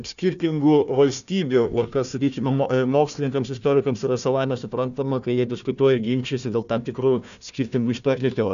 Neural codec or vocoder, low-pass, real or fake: codec, 16 kHz, 1 kbps, FunCodec, trained on Chinese and English, 50 frames a second; 7.2 kHz; fake